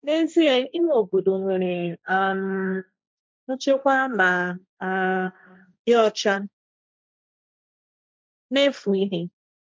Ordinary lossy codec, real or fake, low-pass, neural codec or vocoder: none; fake; none; codec, 16 kHz, 1.1 kbps, Voila-Tokenizer